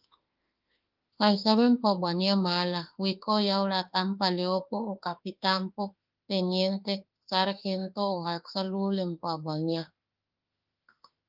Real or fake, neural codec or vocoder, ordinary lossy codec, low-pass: fake; autoencoder, 48 kHz, 32 numbers a frame, DAC-VAE, trained on Japanese speech; Opus, 24 kbps; 5.4 kHz